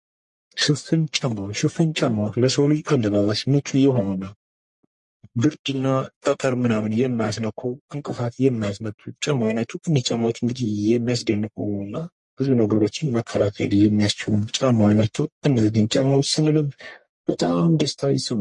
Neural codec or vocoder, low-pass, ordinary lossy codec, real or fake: codec, 44.1 kHz, 1.7 kbps, Pupu-Codec; 10.8 kHz; MP3, 48 kbps; fake